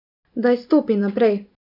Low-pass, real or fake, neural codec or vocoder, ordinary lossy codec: 5.4 kHz; real; none; none